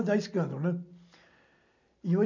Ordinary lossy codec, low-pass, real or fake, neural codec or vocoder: none; 7.2 kHz; real; none